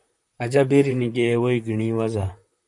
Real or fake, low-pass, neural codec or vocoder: fake; 10.8 kHz; vocoder, 44.1 kHz, 128 mel bands, Pupu-Vocoder